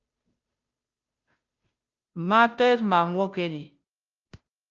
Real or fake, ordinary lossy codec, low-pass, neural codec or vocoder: fake; Opus, 24 kbps; 7.2 kHz; codec, 16 kHz, 0.5 kbps, FunCodec, trained on Chinese and English, 25 frames a second